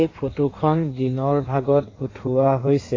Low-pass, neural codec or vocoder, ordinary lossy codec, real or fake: 7.2 kHz; codec, 16 kHz in and 24 kHz out, 1.1 kbps, FireRedTTS-2 codec; AAC, 32 kbps; fake